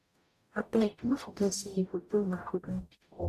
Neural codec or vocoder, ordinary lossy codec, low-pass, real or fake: codec, 44.1 kHz, 0.9 kbps, DAC; none; 14.4 kHz; fake